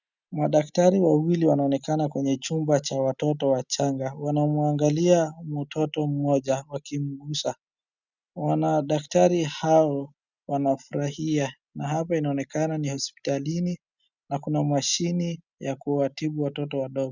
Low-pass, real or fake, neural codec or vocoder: 7.2 kHz; real; none